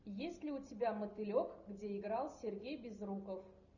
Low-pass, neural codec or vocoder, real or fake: 7.2 kHz; none; real